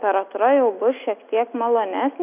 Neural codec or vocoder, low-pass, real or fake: none; 3.6 kHz; real